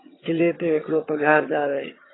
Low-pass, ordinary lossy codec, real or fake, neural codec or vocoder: 7.2 kHz; AAC, 16 kbps; fake; vocoder, 22.05 kHz, 80 mel bands, HiFi-GAN